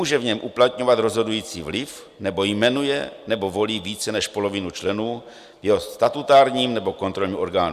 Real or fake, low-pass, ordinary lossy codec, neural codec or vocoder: real; 14.4 kHz; Opus, 64 kbps; none